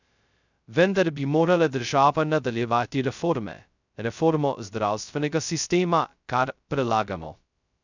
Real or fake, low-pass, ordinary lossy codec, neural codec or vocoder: fake; 7.2 kHz; none; codec, 16 kHz, 0.2 kbps, FocalCodec